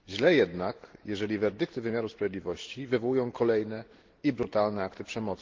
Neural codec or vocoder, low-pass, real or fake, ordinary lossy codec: none; 7.2 kHz; real; Opus, 16 kbps